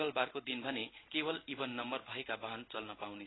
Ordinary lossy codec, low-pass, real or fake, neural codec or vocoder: AAC, 16 kbps; 7.2 kHz; real; none